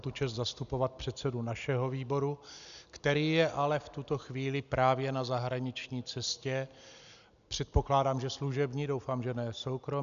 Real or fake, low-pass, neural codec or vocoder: real; 7.2 kHz; none